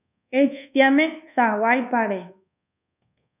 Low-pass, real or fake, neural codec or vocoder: 3.6 kHz; fake; codec, 24 kHz, 1.2 kbps, DualCodec